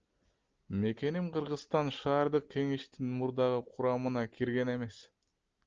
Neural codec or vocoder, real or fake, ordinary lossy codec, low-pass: none; real; Opus, 24 kbps; 7.2 kHz